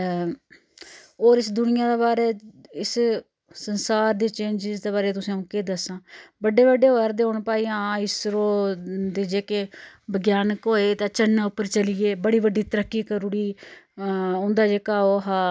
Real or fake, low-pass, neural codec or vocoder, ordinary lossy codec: real; none; none; none